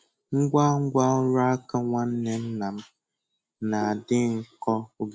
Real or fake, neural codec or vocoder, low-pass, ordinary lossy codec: real; none; none; none